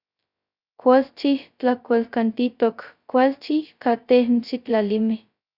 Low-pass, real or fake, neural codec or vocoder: 5.4 kHz; fake; codec, 16 kHz, 0.2 kbps, FocalCodec